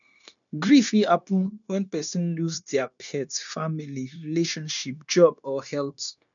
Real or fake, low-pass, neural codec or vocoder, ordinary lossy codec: fake; 7.2 kHz; codec, 16 kHz, 0.9 kbps, LongCat-Audio-Codec; none